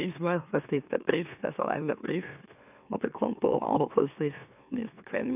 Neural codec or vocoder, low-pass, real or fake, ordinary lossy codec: autoencoder, 44.1 kHz, a latent of 192 numbers a frame, MeloTTS; 3.6 kHz; fake; none